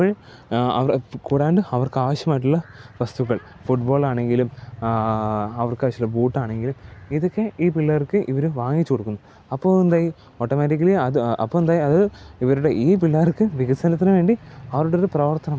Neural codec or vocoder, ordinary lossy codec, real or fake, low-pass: none; none; real; none